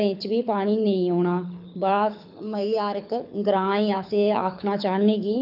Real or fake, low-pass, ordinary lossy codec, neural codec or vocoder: fake; 5.4 kHz; none; codec, 24 kHz, 6 kbps, HILCodec